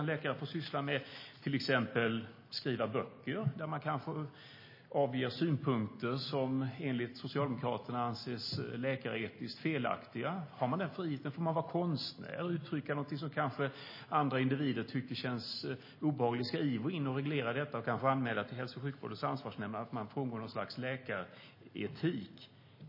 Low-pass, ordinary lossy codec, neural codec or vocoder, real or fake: 5.4 kHz; MP3, 24 kbps; none; real